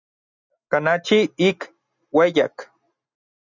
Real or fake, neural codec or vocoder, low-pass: real; none; 7.2 kHz